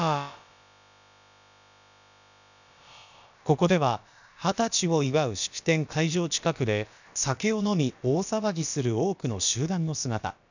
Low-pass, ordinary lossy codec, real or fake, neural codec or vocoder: 7.2 kHz; none; fake; codec, 16 kHz, about 1 kbps, DyCAST, with the encoder's durations